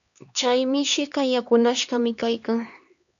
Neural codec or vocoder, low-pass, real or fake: codec, 16 kHz, 2 kbps, X-Codec, HuBERT features, trained on LibriSpeech; 7.2 kHz; fake